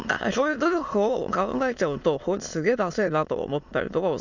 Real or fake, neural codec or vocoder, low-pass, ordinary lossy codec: fake; autoencoder, 22.05 kHz, a latent of 192 numbers a frame, VITS, trained on many speakers; 7.2 kHz; none